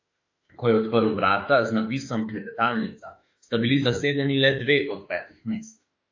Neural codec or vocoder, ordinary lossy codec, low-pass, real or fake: autoencoder, 48 kHz, 32 numbers a frame, DAC-VAE, trained on Japanese speech; AAC, 48 kbps; 7.2 kHz; fake